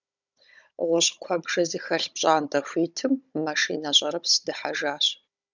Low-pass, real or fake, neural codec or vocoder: 7.2 kHz; fake; codec, 16 kHz, 16 kbps, FunCodec, trained on Chinese and English, 50 frames a second